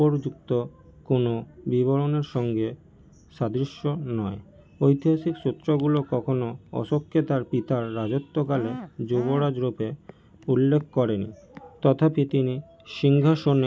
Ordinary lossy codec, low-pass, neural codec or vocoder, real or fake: none; none; none; real